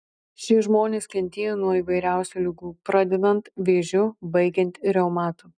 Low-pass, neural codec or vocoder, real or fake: 9.9 kHz; none; real